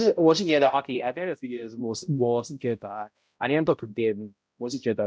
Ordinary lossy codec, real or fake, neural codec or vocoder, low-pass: none; fake; codec, 16 kHz, 0.5 kbps, X-Codec, HuBERT features, trained on balanced general audio; none